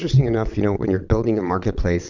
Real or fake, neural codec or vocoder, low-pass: fake; vocoder, 22.05 kHz, 80 mel bands, WaveNeXt; 7.2 kHz